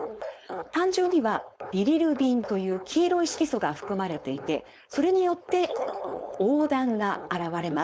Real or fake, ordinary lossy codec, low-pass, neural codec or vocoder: fake; none; none; codec, 16 kHz, 4.8 kbps, FACodec